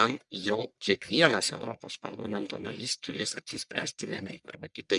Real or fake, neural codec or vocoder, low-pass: fake; codec, 44.1 kHz, 1.7 kbps, Pupu-Codec; 10.8 kHz